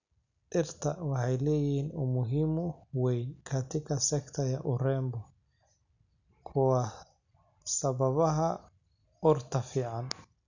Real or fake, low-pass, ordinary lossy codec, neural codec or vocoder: real; 7.2 kHz; none; none